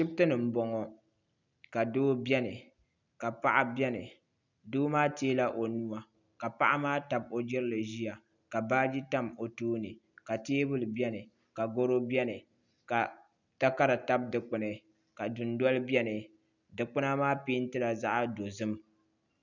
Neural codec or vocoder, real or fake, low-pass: none; real; 7.2 kHz